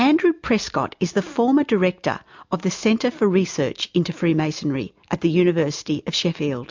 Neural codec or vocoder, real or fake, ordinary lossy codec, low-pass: none; real; MP3, 64 kbps; 7.2 kHz